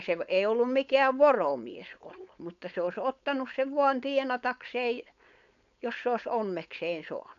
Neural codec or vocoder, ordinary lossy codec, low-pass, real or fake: codec, 16 kHz, 4.8 kbps, FACodec; none; 7.2 kHz; fake